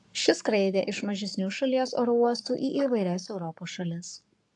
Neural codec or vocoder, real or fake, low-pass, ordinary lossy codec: codec, 44.1 kHz, 7.8 kbps, Pupu-Codec; fake; 10.8 kHz; AAC, 64 kbps